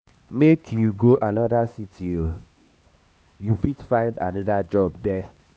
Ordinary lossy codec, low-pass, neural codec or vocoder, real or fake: none; none; codec, 16 kHz, 2 kbps, X-Codec, HuBERT features, trained on LibriSpeech; fake